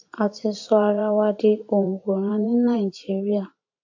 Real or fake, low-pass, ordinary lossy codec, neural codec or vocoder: fake; 7.2 kHz; AAC, 48 kbps; vocoder, 44.1 kHz, 128 mel bands every 512 samples, BigVGAN v2